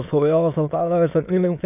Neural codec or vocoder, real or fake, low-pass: autoencoder, 22.05 kHz, a latent of 192 numbers a frame, VITS, trained on many speakers; fake; 3.6 kHz